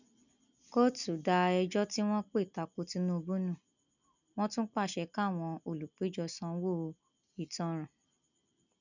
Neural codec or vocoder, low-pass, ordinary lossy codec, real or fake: none; 7.2 kHz; none; real